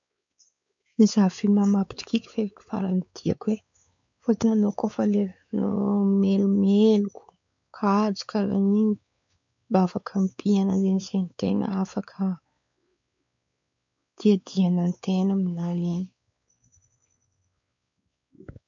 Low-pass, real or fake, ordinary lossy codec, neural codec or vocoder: 7.2 kHz; fake; MP3, 64 kbps; codec, 16 kHz, 4 kbps, X-Codec, WavLM features, trained on Multilingual LibriSpeech